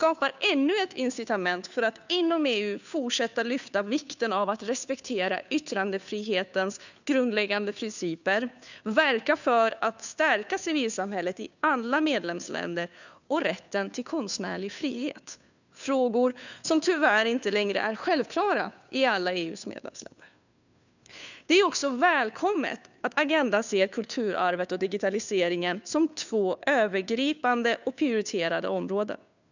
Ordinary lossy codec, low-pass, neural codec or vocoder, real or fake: none; 7.2 kHz; codec, 16 kHz, 2 kbps, FunCodec, trained on Chinese and English, 25 frames a second; fake